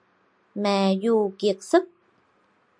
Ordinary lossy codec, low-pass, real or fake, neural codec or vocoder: MP3, 64 kbps; 9.9 kHz; real; none